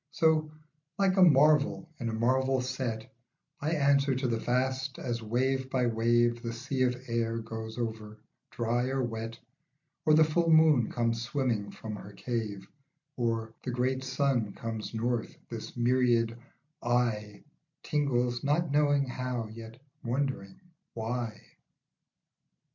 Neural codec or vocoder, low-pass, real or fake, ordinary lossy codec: none; 7.2 kHz; real; MP3, 48 kbps